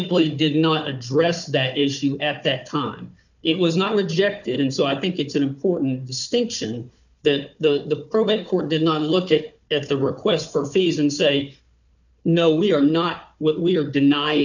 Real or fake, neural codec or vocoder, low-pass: fake; codec, 16 kHz, 4 kbps, FunCodec, trained on Chinese and English, 50 frames a second; 7.2 kHz